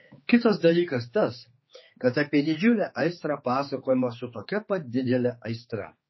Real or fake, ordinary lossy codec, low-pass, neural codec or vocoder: fake; MP3, 24 kbps; 7.2 kHz; codec, 16 kHz, 4 kbps, X-Codec, HuBERT features, trained on general audio